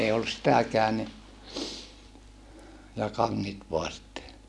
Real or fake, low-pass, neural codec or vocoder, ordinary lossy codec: real; none; none; none